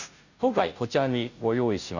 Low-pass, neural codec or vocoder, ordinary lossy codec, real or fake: 7.2 kHz; codec, 16 kHz, 0.5 kbps, FunCodec, trained on Chinese and English, 25 frames a second; none; fake